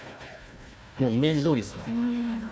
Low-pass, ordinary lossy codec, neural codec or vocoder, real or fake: none; none; codec, 16 kHz, 1 kbps, FunCodec, trained on Chinese and English, 50 frames a second; fake